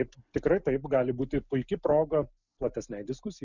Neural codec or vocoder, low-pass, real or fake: none; 7.2 kHz; real